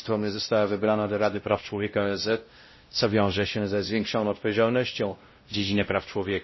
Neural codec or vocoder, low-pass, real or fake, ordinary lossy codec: codec, 16 kHz, 0.5 kbps, X-Codec, WavLM features, trained on Multilingual LibriSpeech; 7.2 kHz; fake; MP3, 24 kbps